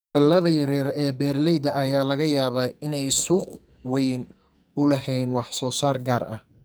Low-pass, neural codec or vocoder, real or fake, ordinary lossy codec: none; codec, 44.1 kHz, 2.6 kbps, SNAC; fake; none